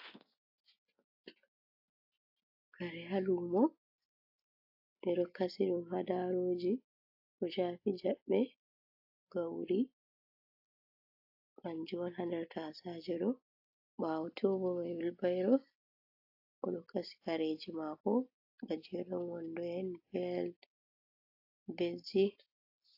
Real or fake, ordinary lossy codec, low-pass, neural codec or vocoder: real; AAC, 32 kbps; 5.4 kHz; none